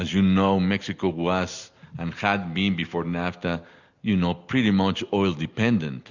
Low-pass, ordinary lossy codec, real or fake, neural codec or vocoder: 7.2 kHz; Opus, 64 kbps; real; none